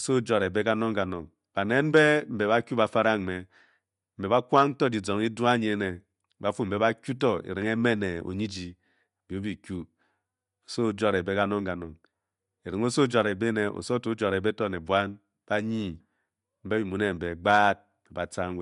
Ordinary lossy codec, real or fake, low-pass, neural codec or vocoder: MP3, 64 kbps; real; 10.8 kHz; none